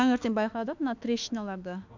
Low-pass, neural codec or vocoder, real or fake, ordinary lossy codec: 7.2 kHz; autoencoder, 48 kHz, 32 numbers a frame, DAC-VAE, trained on Japanese speech; fake; none